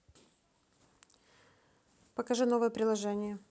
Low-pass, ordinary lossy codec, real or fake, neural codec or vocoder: none; none; real; none